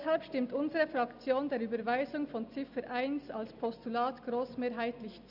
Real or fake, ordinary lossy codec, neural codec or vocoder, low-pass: real; none; none; 5.4 kHz